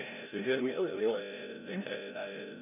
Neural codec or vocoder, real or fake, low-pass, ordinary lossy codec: codec, 16 kHz, 0.5 kbps, FreqCodec, larger model; fake; 3.6 kHz; none